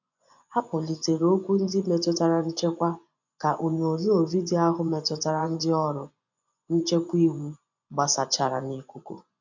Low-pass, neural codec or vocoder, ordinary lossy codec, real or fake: 7.2 kHz; vocoder, 24 kHz, 100 mel bands, Vocos; none; fake